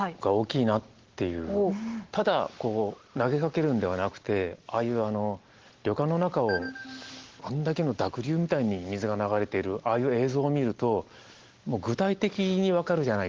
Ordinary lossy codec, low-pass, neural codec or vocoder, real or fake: Opus, 16 kbps; 7.2 kHz; none; real